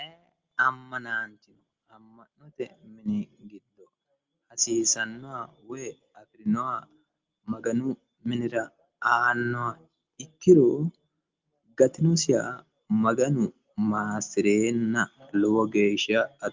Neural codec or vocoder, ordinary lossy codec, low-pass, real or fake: none; Opus, 32 kbps; 7.2 kHz; real